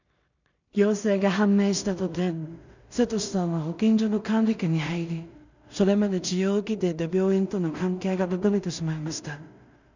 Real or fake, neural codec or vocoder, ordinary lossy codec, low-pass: fake; codec, 16 kHz in and 24 kHz out, 0.4 kbps, LongCat-Audio-Codec, two codebook decoder; none; 7.2 kHz